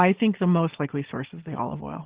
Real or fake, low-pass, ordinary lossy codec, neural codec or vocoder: real; 3.6 kHz; Opus, 16 kbps; none